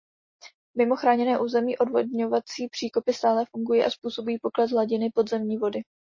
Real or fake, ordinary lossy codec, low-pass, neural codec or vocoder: real; MP3, 32 kbps; 7.2 kHz; none